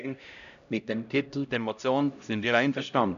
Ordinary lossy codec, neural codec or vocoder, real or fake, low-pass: none; codec, 16 kHz, 0.5 kbps, X-Codec, HuBERT features, trained on LibriSpeech; fake; 7.2 kHz